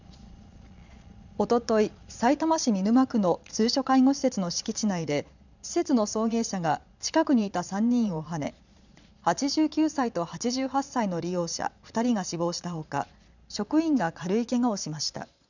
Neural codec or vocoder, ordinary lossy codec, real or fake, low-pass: vocoder, 22.05 kHz, 80 mel bands, Vocos; none; fake; 7.2 kHz